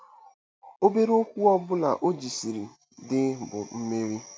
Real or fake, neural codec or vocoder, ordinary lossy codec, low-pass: real; none; none; none